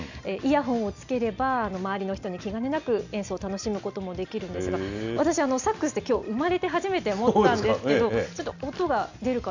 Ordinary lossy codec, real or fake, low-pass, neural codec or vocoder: none; real; 7.2 kHz; none